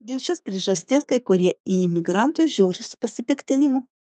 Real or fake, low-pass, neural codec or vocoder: fake; 10.8 kHz; codec, 32 kHz, 1.9 kbps, SNAC